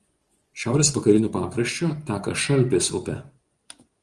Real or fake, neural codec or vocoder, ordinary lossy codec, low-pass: fake; vocoder, 24 kHz, 100 mel bands, Vocos; Opus, 24 kbps; 10.8 kHz